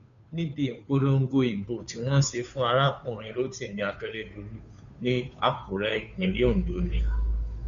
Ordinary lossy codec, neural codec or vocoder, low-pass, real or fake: none; codec, 16 kHz, 2 kbps, FunCodec, trained on Chinese and English, 25 frames a second; 7.2 kHz; fake